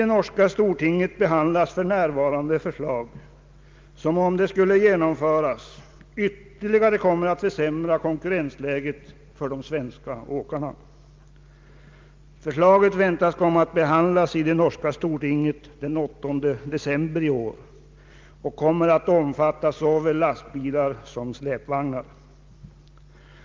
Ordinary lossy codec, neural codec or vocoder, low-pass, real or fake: Opus, 32 kbps; none; 7.2 kHz; real